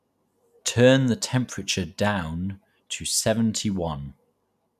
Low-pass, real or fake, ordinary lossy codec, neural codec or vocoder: 14.4 kHz; real; none; none